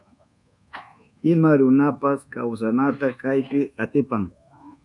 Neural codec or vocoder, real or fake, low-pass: codec, 24 kHz, 1.2 kbps, DualCodec; fake; 10.8 kHz